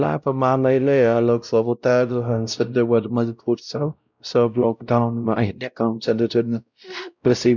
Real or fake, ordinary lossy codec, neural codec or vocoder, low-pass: fake; none; codec, 16 kHz, 0.5 kbps, X-Codec, WavLM features, trained on Multilingual LibriSpeech; 7.2 kHz